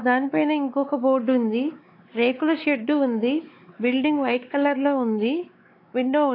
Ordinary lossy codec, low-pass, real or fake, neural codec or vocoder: AAC, 32 kbps; 5.4 kHz; fake; codec, 16 kHz, 4 kbps, X-Codec, WavLM features, trained on Multilingual LibriSpeech